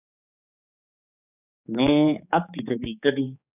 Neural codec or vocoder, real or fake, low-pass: codec, 16 kHz, 4 kbps, X-Codec, HuBERT features, trained on general audio; fake; 3.6 kHz